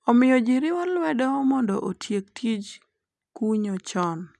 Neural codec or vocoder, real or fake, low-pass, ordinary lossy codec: none; real; none; none